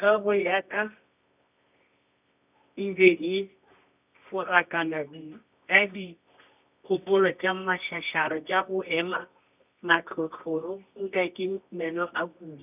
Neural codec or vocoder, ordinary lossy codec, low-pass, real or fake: codec, 24 kHz, 0.9 kbps, WavTokenizer, medium music audio release; none; 3.6 kHz; fake